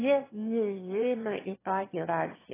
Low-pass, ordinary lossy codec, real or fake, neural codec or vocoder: 3.6 kHz; AAC, 16 kbps; fake; autoencoder, 22.05 kHz, a latent of 192 numbers a frame, VITS, trained on one speaker